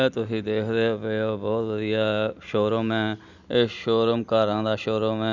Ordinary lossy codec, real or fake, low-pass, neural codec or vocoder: none; real; 7.2 kHz; none